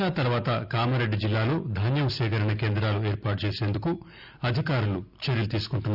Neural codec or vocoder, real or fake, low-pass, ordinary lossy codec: none; real; 5.4 kHz; Opus, 64 kbps